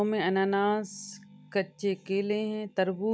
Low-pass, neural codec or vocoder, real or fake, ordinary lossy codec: none; none; real; none